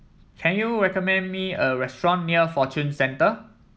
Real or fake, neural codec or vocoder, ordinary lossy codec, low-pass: real; none; none; none